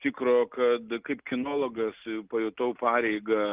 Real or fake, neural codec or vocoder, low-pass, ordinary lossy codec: real; none; 3.6 kHz; Opus, 32 kbps